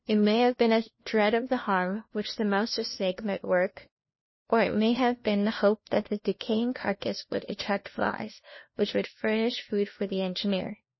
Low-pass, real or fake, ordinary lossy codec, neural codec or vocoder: 7.2 kHz; fake; MP3, 24 kbps; codec, 16 kHz, 1 kbps, FunCodec, trained on LibriTTS, 50 frames a second